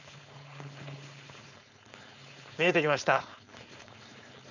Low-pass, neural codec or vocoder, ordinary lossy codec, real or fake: 7.2 kHz; codec, 16 kHz, 4.8 kbps, FACodec; none; fake